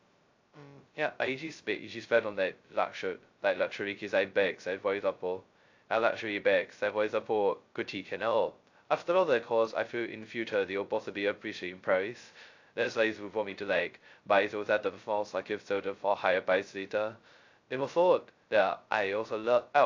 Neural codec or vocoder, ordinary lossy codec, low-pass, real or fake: codec, 16 kHz, 0.2 kbps, FocalCodec; AAC, 48 kbps; 7.2 kHz; fake